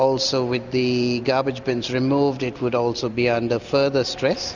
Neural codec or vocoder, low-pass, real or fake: none; 7.2 kHz; real